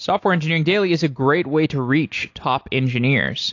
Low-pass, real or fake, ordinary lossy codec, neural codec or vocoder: 7.2 kHz; real; AAC, 48 kbps; none